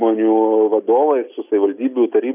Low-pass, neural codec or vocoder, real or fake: 3.6 kHz; none; real